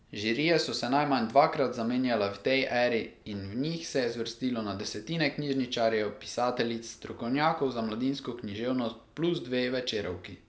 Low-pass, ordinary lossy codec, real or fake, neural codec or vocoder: none; none; real; none